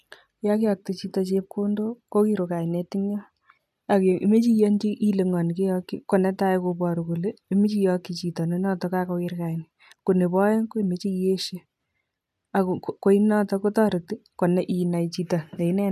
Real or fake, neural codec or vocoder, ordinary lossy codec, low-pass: real; none; none; 14.4 kHz